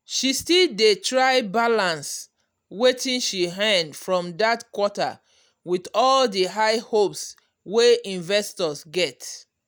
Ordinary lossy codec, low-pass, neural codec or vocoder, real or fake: none; none; none; real